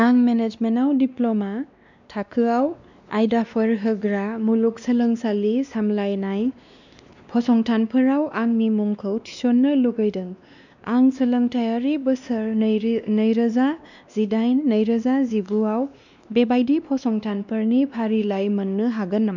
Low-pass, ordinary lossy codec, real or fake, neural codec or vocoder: 7.2 kHz; none; fake; codec, 16 kHz, 2 kbps, X-Codec, WavLM features, trained on Multilingual LibriSpeech